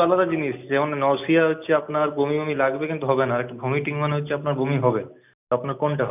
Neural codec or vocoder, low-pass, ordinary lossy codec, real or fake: none; 3.6 kHz; none; real